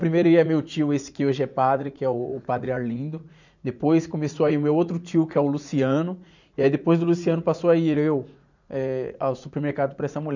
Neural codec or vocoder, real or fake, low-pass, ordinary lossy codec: vocoder, 44.1 kHz, 80 mel bands, Vocos; fake; 7.2 kHz; none